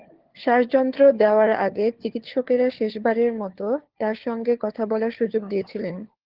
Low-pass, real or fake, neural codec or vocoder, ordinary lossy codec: 5.4 kHz; fake; codec, 16 kHz, 4 kbps, FunCodec, trained on LibriTTS, 50 frames a second; Opus, 16 kbps